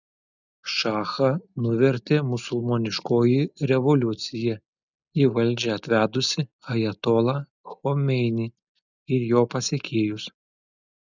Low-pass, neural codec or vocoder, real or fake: 7.2 kHz; none; real